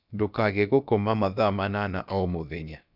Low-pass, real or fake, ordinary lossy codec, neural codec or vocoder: 5.4 kHz; fake; none; codec, 16 kHz, about 1 kbps, DyCAST, with the encoder's durations